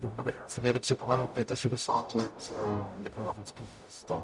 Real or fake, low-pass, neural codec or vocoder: fake; 10.8 kHz; codec, 44.1 kHz, 0.9 kbps, DAC